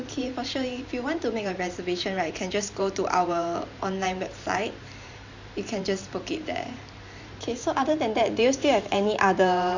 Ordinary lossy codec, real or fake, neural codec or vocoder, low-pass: Opus, 64 kbps; fake; vocoder, 44.1 kHz, 128 mel bands every 512 samples, BigVGAN v2; 7.2 kHz